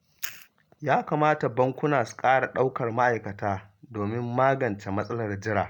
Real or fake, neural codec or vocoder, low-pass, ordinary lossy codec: real; none; 19.8 kHz; none